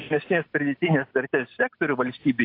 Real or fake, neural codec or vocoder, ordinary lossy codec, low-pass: real; none; AAC, 32 kbps; 5.4 kHz